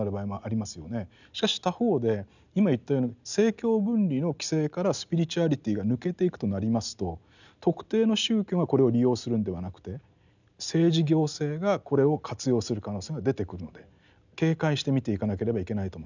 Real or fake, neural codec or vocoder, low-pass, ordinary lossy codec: real; none; 7.2 kHz; none